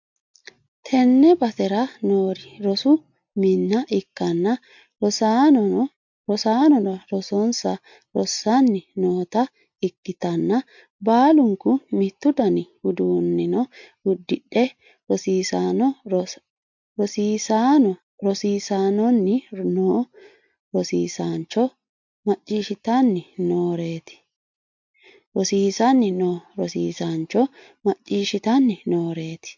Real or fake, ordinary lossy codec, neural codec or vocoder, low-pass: real; MP3, 48 kbps; none; 7.2 kHz